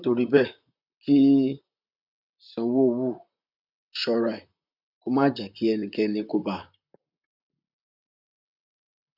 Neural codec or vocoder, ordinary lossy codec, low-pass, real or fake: vocoder, 44.1 kHz, 128 mel bands, Pupu-Vocoder; none; 5.4 kHz; fake